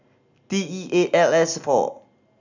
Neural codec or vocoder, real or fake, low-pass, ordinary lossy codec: none; real; 7.2 kHz; none